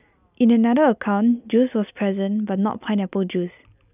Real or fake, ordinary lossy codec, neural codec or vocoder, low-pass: real; none; none; 3.6 kHz